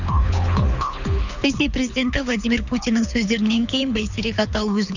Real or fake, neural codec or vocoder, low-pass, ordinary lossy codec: fake; codec, 24 kHz, 6 kbps, HILCodec; 7.2 kHz; none